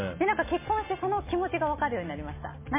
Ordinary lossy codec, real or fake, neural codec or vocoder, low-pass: MP3, 16 kbps; real; none; 3.6 kHz